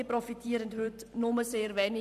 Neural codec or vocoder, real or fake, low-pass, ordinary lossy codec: vocoder, 44.1 kHz, 128 mel bands every 512 samples, BigVGAN v2; fake; 14.4 kHz; none